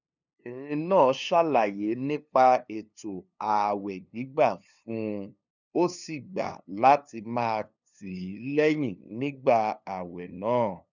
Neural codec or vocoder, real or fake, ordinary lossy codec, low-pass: codec, 16 kHz, 2 kbps, FunCodec, trained on LibriTTS, 25 frames a second; fake; none; 7.2 kHz